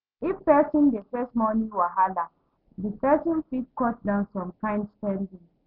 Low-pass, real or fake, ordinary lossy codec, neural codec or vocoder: 5.4 kHz; real; none; none